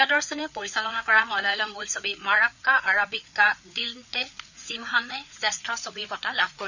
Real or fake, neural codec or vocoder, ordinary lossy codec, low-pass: fake; codec, 16 kHz, 4 kbps, FreqCodec, larger model; none; 7.2 kHz